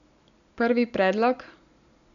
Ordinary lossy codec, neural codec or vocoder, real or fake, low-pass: none; none; real; 7.2 kHz